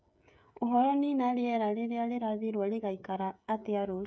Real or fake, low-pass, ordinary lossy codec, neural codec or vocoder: fake; none; none; codec, 16 kHz, 16 kbps, FreqCodec, smaller model